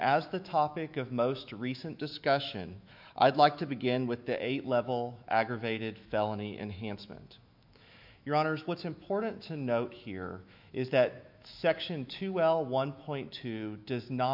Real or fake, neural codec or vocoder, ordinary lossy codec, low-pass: fake; autoencoder, 48 kHz, 128 numbers a frame, DAC-VAE, trained on Japanese speech; MP3, 48 kbps; 5.4 kHz